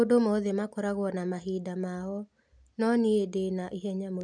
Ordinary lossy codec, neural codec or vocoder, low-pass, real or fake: none; none; 9.9 kHz; real